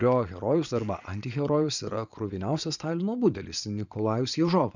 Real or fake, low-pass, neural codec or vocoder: fake; 7.2 kHz; vocoder, 22.05 kHz, 80 mel bands, Vocos